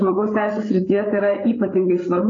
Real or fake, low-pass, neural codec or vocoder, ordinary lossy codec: fake; 7.2 kHz; codec, 16 kHz, 8 kbps, FreqCodec, larger model; AAC, 32 kbps